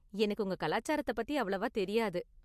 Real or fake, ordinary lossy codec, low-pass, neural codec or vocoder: real; MP3, 64 kbps; 14.4 kHz; none